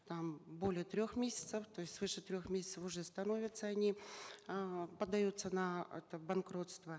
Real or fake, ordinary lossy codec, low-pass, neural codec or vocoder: real; none; none; none